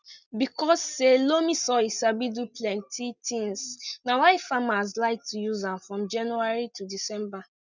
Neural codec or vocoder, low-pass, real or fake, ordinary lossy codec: none; 7.2 kHz; real; none